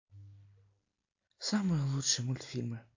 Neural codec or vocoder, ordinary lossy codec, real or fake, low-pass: none; AAC, 48 kbps; real; 7.2 kHz